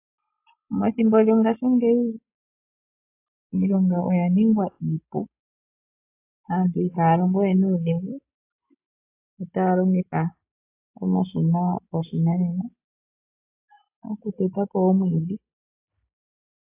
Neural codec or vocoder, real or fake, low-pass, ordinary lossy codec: none; real; 3.6 kHz; AAC, 24 kbps